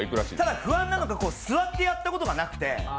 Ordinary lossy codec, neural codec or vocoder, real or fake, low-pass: none; none; real; none